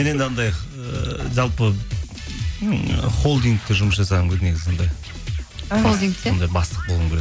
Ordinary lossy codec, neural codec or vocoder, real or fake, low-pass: none; none; real; none